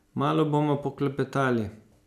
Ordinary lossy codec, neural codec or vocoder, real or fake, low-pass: none; none; real; 14.4 kHz